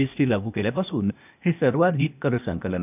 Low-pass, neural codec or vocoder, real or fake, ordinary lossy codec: 3.6 kHz; codec, 16 kHz, 0.8 kbps, ZipCodec; fake; none